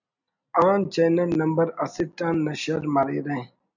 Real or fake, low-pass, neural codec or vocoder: real; 7.2 kHz; none